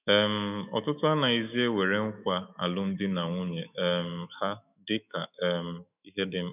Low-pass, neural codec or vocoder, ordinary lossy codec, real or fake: 3.6 kHz; autoencoder, 48 kHz, 128 numbers a frame, DAC-VAE, trained on Japanese speech; none; fake